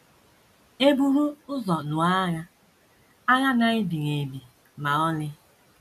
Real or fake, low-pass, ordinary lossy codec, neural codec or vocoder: real; 14.4 kHz; none; none